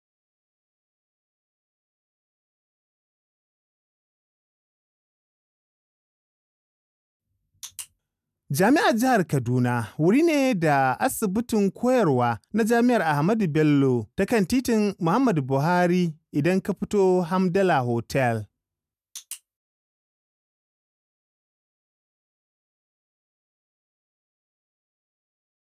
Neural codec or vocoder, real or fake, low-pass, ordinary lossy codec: none; real; 14.4 kHz; none